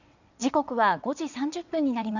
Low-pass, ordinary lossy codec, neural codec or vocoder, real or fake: 7.2 kHz; none; vocoder, 22.05 kHz, 80 mel bands, WaveNeXt; fake